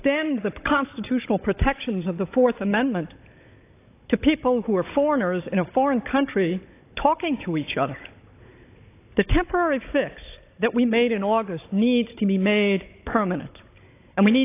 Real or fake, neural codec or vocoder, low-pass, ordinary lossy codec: fake; codec, 16 kHz, 16 kbps, FunCodec, trained on Chinese and English, 50 frames a second; 3.6 kHz; AAC, 24 kbps